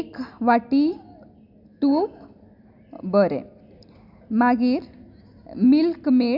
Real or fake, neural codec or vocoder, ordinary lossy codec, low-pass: real; none; none; 5.4 kHz